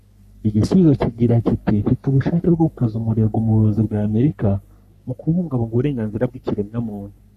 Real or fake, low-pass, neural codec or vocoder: fake; 14.4 kHz; codec, 44.1 kHz, 3.4 kbps, Pupu-Codec